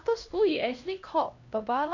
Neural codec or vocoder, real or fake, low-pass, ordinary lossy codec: codec, 16 kHz, 1 kbps, X-Codec, HuBERT features, trained on LibriSpeech; fake; 7.2 kHz; none